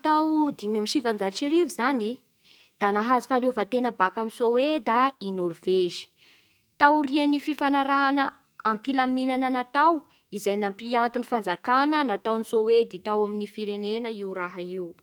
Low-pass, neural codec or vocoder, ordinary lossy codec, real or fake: none; codec, 44.1 kHz, 2.6 kbps, SNAC; none; fake